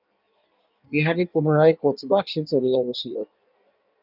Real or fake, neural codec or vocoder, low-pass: fake; codec, 16 kHz in and 24 kHz out, 1.1 kbps, FireRedTTS-2 codec; 5.4 kHz